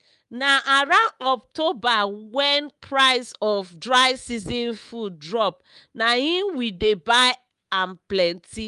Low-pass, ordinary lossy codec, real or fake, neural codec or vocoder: 10.8 kHz; Opus, 32 kbps; fake; codec, 24 kHz, 3.1 kbps, DualCodec